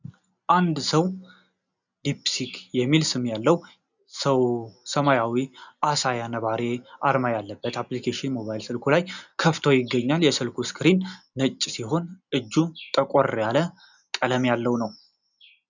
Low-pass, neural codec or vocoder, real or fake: 7.2 kHz; none; real